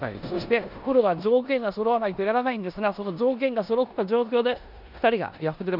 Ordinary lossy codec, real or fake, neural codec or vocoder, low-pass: none; fake; codec, 16 kHz in and 24 kHz out, 0.9 kbps, LongCat-Audio-Codec, four codebook decoder; 5.4 kHz